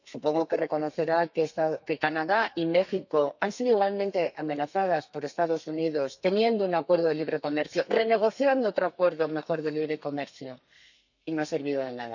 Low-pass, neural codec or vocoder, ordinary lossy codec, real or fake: 7.2 kHz; codec, 32 kHz, 1.9 kbps, SNAC; none; fake